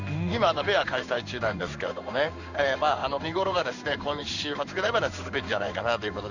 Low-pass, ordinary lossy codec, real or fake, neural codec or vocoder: 7.2 kHz; none; fake; codec, 16 kHz in and 24 kHz out, 1 kbps, XY-Tokenizer